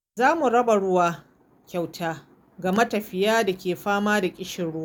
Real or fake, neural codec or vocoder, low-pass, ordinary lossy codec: real; none; none; none